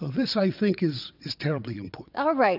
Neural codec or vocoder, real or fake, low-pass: vocoder, 22.05 kHz, 80 mel bands, WaveNeXt; fake; 5.4 kHz